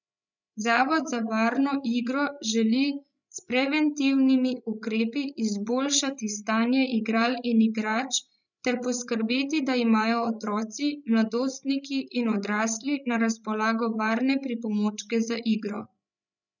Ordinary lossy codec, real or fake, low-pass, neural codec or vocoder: none; fake; 7.2 kHz; codec, 16 kHz, 16 kbps, FreqCodec, larger model